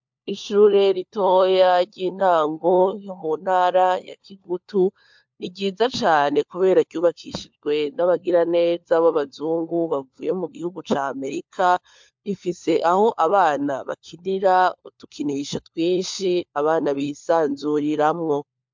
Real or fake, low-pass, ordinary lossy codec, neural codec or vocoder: fake; 7.2 kHz; MP3, 64 kbps; codec, 16 kHz, 4 kbps, FunCodec, trained on LibriTTS, 50 frames a second